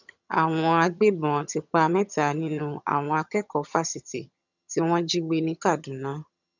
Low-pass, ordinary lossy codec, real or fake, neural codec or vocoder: 7.2 kHz; none; fake; vocoder, 22.05 kHz, 80 mel bands, HiFi-GAN